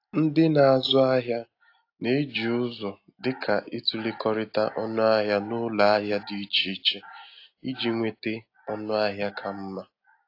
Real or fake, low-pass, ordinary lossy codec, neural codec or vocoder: real; 5.4 kHz; AAC, 32 kbps; none